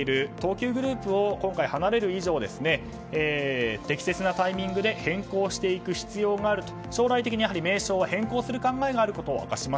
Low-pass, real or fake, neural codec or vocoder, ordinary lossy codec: none; real; none; none